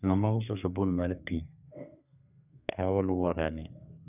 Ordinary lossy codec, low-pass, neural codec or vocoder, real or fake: none; 3.6 kHz; codec, 32 kHz, 1.9 kbps, SNAC; fake